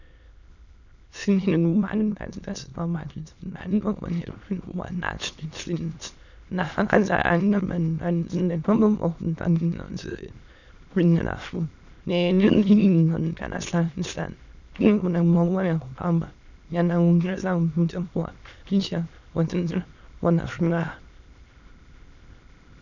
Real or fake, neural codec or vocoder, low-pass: fake; autoencoder, 22.05 kHz, a latent of 192 numbers a frame, VITS, trained on many speakers; 7.2 kHz